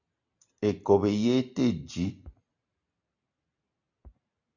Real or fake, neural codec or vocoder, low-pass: real; none; 7.2 kHz